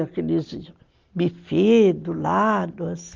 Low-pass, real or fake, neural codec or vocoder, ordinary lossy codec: 7.2 kHz; real; none; Opus, 24 kbps